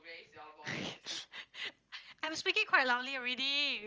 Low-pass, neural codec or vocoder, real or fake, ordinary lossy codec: 7.2 kHz; none; real; Opus, 24 kbps